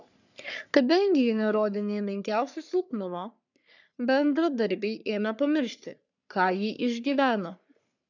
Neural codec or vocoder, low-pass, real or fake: codec, 44.1 kHz, 3.4 kbps, Pupu-Codec; 7.2 kHz; fake